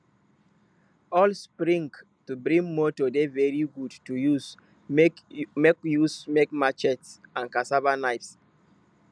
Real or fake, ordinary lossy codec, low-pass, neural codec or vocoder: real; none; none; none